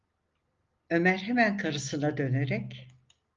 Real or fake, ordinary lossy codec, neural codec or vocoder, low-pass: real; Opus, 32 kbps; none; 7.2 kHz